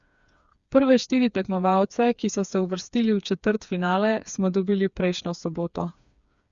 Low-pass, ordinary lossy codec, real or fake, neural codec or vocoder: 7.2 kHz; Opus, 64 kbps; fake; codec, 16 kHz, 4 kbps, FreqCodec, smaller model